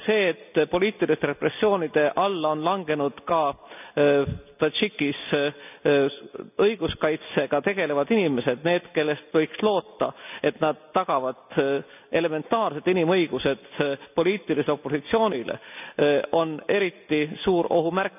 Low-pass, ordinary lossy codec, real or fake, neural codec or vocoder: 3.6 kHz; none; real; none